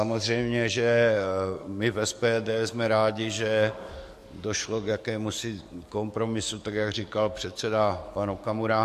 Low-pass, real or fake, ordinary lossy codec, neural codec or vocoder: 14.4 kHz; fake; MP3, 64 kbps; codec, 44.1 kHz, 7.8 kbps, DAC